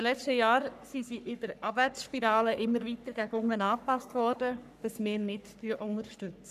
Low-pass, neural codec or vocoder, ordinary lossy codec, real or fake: 14.4 kHz; codec, 44.1 kHz, 3.4 kbps, Pupu-Codec; none; fake